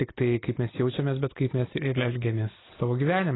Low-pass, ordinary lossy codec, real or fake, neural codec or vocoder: 7.2 kHz; AAC, 16 kbps; real; none